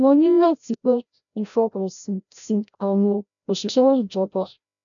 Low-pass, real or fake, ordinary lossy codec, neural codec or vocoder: 7.2 kHz; fake; none; codec, 16 kHz, 0.5 kbps, FreqCodec, larger model